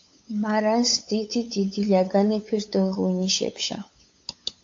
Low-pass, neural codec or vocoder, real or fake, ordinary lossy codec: 7.2 kHz; codec, 16 kHz, 8 kbps, FunCodec, trained on LibriTTS, 25 frames a second; fake; AAC, 48 kbps